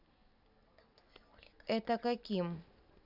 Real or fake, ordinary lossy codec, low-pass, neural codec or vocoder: real; none; 5.4 kHz; none